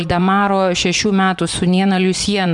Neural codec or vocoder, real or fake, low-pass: vocoder, 24 kHz, 100 mel bands, Vocos; fake; 10.8 kHz